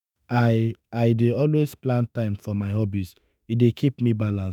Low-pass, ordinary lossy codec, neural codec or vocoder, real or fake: 19.8 kHz; none; autoencoder, 48 kHz, 32 numbers a frame, DAC-VAE, trained on Japanese speech; fake